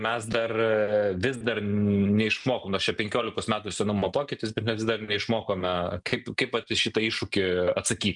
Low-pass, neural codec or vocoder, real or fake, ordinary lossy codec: 10.8 kHz; none; real; MP3, 96 kbps